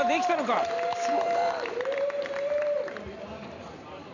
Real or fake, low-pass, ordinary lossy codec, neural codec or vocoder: fake; 7.2 kHz; none; vocoder, 44.1 kHz, 128 mel bands every 512 samples, BigVGAN v2